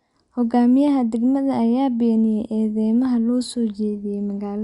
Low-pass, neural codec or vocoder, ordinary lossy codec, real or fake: 10.8 kHz; none; Opus, 64 kbps; real